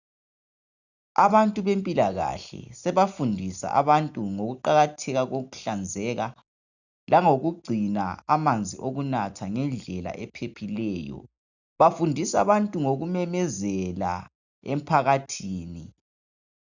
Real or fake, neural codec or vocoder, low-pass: real; none; 7.2 kHz